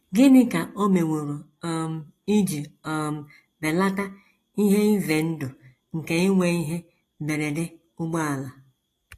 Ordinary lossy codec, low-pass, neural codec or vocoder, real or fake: AAC, 48 kbps; 14.4 kHz; none; real